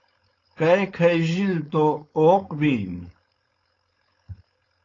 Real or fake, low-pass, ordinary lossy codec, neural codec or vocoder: fake; 7.2 kHz; AAC, 32 kbps; codec, 16 kHz, 4.8 kbps, FACodec